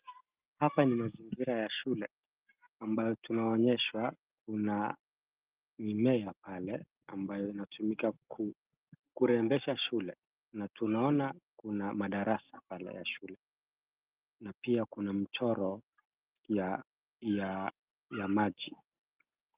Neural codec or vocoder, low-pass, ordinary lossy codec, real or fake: none; 3.6 kHz; Opus, 32 kbps; real